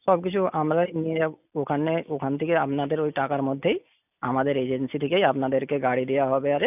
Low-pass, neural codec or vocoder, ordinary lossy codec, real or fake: 3.6 kHz; none; none; real